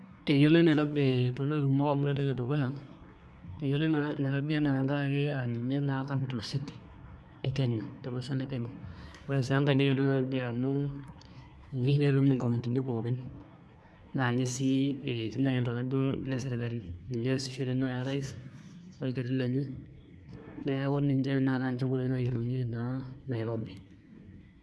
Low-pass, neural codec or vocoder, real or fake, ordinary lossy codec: none; codec, 24 kHz, 1 kbps, SNAC; fake; none